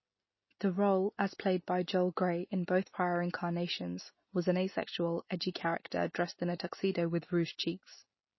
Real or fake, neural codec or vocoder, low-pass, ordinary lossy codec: real; none; 7.2 kHz; MP3, 24 kbps